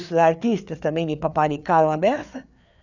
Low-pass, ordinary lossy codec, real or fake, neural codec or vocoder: 7.2 kHz; none; fake; codec, 16 kHz, 4 kbps, FreqCodec, larger model